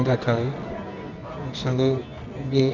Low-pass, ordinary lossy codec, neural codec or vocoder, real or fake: 7.2 kHz; none; codec, 24 kHz, 0.9 kbps, WavTokenizer, medium music audio release; fake